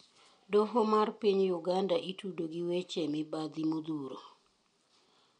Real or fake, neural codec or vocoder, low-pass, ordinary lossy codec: real; none; 9.9 kHz; MP3, 64 kbps